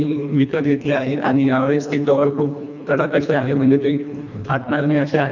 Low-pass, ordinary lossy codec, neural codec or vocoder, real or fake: 7.2 kHz; none; codec, 24 kHz, 1.5 kbps, HILCodec; fake